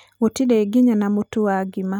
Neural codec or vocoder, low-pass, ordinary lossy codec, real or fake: none; 19.8 kHz; none; real